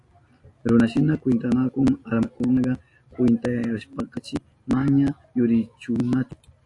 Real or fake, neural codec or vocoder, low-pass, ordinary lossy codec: real; none; 10.8 kHz; AAC, 48 kbps